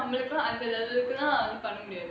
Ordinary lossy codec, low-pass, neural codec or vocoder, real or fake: none; none; none; real